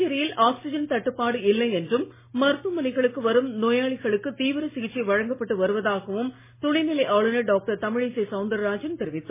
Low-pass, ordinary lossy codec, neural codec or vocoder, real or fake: 3.6 kHz; MP3, 16 kbps; none; real